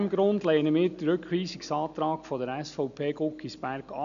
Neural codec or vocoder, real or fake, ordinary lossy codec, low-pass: none; real; none; 7.2 kHz